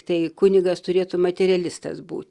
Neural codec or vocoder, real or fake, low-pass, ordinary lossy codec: none; real; 10.8 kHz; Opus, 64 kbps